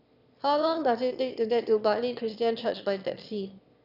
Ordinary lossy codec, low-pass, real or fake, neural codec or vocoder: none; 5.4 kHz; fake; autoencoder, 22.05 kHz, a latent of 192 numbers a frame, VITS, trained on one speaker